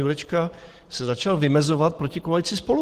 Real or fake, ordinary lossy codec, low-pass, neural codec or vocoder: real; Opus, 16 kbps; 14.4 kHz; none